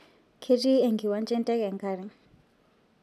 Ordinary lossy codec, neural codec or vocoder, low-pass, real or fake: none; none; 14.4 kHz; real